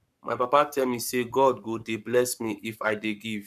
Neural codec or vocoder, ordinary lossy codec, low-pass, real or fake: codec, 44.1 kHz, 7.8 kbps, Pupu-Codec; none; 14.4 kHz; fake